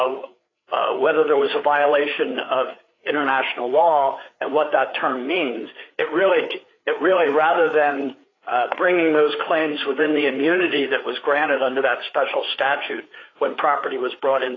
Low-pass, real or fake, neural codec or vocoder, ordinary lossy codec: 7.2 kHz; fake; codec, 16 kHz, 4 kbps, FreqCodec, larger model; AAC, 32 kbps